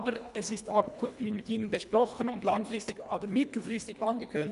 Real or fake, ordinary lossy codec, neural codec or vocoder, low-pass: fake; none; codec, 24 kHz, 1.5 kbps, HILCodec; 10.8 kHz